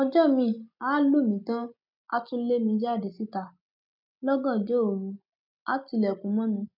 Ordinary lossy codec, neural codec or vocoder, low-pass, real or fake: none; none; 5.4 kHz; real